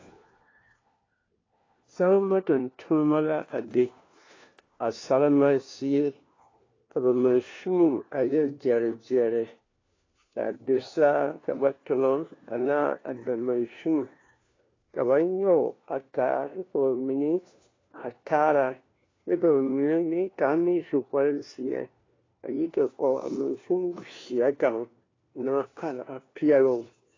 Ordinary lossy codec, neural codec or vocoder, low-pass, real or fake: AAC, 32 kbps; codec, 16 kHz, 1 kbps, FunCodec, trained on LibriTTS, 50 frames a second; 7.2 kHz; fake